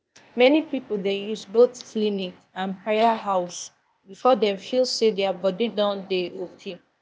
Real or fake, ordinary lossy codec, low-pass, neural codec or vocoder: fake; none; none; codec, 16 kHz, 0.8 kbps, ZipCodec